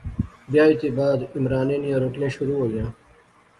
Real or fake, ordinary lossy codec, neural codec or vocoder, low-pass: real; Opus, 32 kbps; none; 10.8 kHz